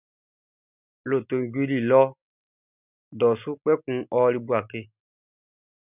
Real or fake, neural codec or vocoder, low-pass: real; none; 3.6 kHz